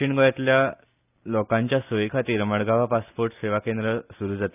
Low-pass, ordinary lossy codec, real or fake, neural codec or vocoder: 3.6 kHz; none; real; none